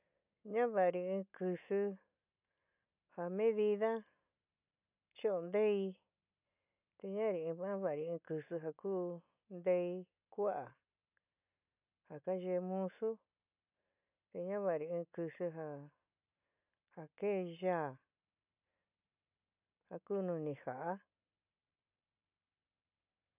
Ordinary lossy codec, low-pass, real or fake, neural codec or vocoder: none; 3.6 kHz; real; none